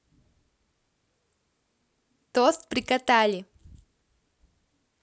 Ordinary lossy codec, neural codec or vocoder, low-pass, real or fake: none; none; none; real